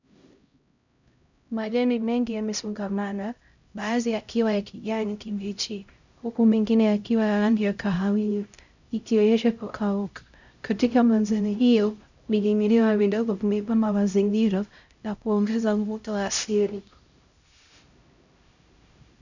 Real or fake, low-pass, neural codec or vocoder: fake; 7.2 kHz; codec, 16 kHz, 0.5 kbps, X-Codec, HuBERT features, trained on LibriSpeech